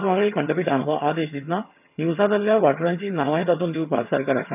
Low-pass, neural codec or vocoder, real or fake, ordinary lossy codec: 3.6 kHz; vocoder, 22.05 kHz, 80 mel bands, HiFi-GAN; fake; none